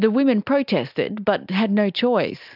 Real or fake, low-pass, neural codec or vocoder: real; 5.4 kHz; none